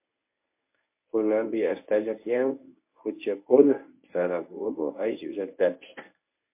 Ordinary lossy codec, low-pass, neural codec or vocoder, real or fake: MP3, 24 kbps; 3.6 kHz; codec, 24 kHz, 0.9 kbps, WavTokenizer, medium speech release version 1; fake